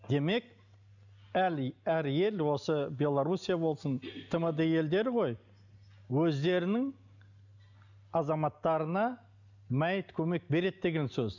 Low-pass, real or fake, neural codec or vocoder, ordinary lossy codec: 7.2 kHz; real; none; none